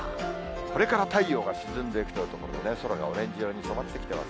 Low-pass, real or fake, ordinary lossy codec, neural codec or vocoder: none; real; none; none